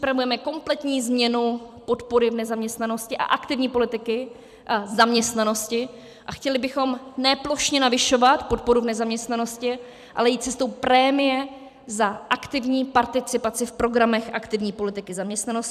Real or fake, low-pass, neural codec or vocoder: fake; 14.4 kHz; vocoder, 44.1 kHz, 128 mel bands every 256 samples, BigVGAN v2